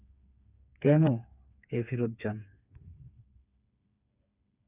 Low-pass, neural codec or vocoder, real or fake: 3.6 kHz; codec, 16 kHz, 4 kbps, FreqCodec, smaller model; fake